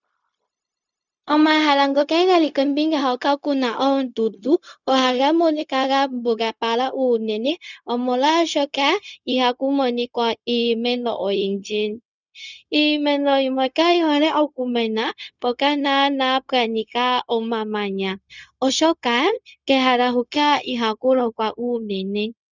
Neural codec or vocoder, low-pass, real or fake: codec, 16 kHz, 0.4 kbps, LongCat-Audio-Codec; 7.2 kHz; fake